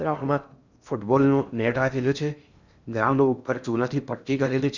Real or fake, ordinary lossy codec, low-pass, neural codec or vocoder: fake; none; 7.2 kHz; codec, 16 kHz in and 24 kHz out, 0.6 kbps, FocalCodec, streaming, 2048 codes